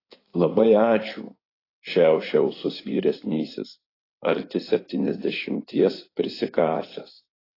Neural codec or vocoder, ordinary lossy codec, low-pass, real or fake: codec, 16 kHz in and 24 kHz out, 2.2 kbps, FireRedTTS-2 codec; AAC, 24 kbps; 5.4 kHz; fake